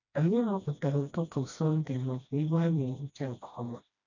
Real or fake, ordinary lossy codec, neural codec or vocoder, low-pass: fake; none; codec, 16 kHz, 1 kbps, FreqCodec, smaller model; 7.2 kHz